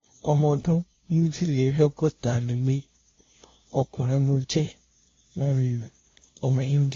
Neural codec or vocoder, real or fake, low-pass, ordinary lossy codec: codec, 16 kHz, 0.5 kbps, FunCodec, trained on LibriTTS, 25 frames a second; fake; 7.2 kHz; AAC, 32 kbps